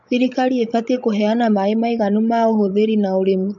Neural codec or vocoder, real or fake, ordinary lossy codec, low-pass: codec, 16 kHz, 16 kbps, FreqCodec, larger model; fake; MP3, 48 kbps; 7.2 kHz